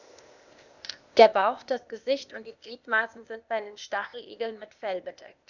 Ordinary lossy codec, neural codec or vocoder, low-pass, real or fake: none; codec, 16 kHz, 0.8 kbps, ZipCodec; 7.2 kHz; fake